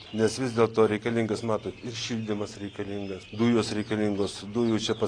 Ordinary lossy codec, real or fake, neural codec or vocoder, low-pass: AAC, 32 kbps; real; none; 9.9 kHz